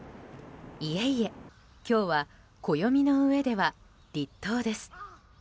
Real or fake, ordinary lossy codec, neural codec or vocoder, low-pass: real; none; none; none